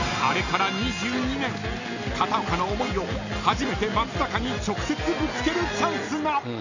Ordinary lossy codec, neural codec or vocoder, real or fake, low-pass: none; none; real; 7.2 kHz